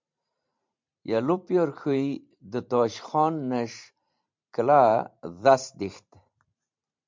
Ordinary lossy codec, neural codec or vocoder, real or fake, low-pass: MP3, 64 kbps; vocoder, 44.1 kHz, 128 mel bands every 512 samples, BigVGAN v2; fake; 7.2 kHz